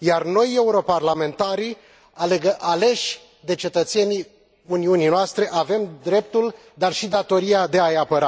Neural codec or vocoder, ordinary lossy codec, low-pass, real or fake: none; none; none; real